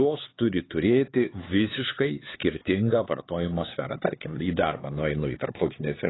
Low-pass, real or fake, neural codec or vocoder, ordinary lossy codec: 7.2 kHz; fake; codec, 16 kHz, 4 kbps, X-Codec, HuBERT features, trained on LibriSpeech; AAC, 16 kbps